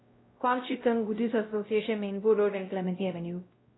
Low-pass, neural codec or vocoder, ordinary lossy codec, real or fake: 7.2 kHz; codec, 16 kHz, 0.5 kbps, X-Codec, WavLM features, trained on Multilingual LibriSpeech; AAC, 16 kbps; fake